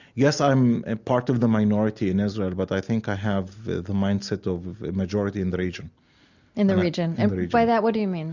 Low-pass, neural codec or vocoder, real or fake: 7.2 kHz; none; real